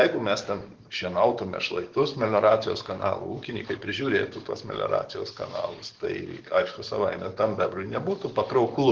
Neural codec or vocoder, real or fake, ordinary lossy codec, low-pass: codec, 44.1 kHz, 7.8 kbps, DAC; fake; Opus, 16 kbps; 7.2 kHz